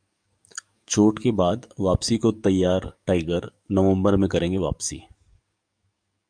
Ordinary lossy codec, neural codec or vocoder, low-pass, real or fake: MP3, 96 kbps; codec, 44.1 kHz, 7.8 kbps, DAC; 9.9 kHz; fake